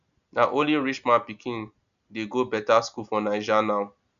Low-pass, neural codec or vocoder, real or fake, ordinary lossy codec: 7.2 kHz; none; real; none